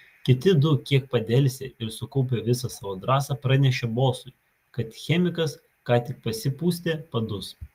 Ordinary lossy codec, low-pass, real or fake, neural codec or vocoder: Opus, 32 kbps; 14.4 kHz; real; none